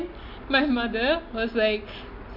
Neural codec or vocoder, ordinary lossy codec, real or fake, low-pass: none; MP3, 48 kbps; real; 5.4 kHz